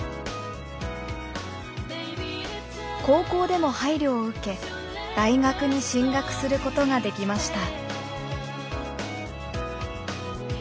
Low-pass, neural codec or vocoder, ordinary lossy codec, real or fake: none; none; none; real